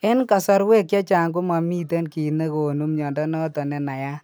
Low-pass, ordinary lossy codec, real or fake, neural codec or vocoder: none; none; real; none